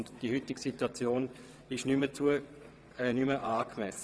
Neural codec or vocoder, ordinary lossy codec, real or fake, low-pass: vocoder, 22.05 kHz, 80 mel bands, WaveNeXt; none; fake; none